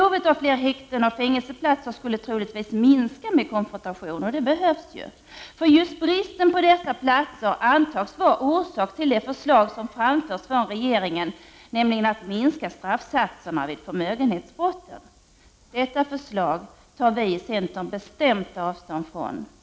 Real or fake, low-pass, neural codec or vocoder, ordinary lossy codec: real; none; none; none